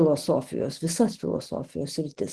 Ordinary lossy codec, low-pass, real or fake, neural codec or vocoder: Opus, 16 kbps; 10.8 kHz; real; none